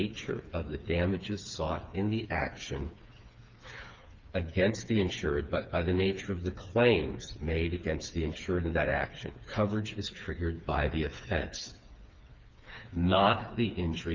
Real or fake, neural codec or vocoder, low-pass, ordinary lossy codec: fake; codec, 16 kHz, 4 kbps, FreqCodec, smaller model; 7.2 kHz; Opus, 24 kbps